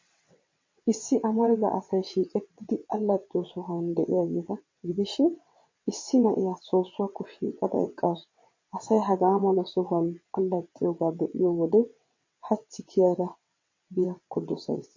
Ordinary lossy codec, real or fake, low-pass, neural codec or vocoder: MP3, 32 kbps; fake; 7.2 kHz; vocoder, 22.05 kHz, 80 mel bands, WaveNeXt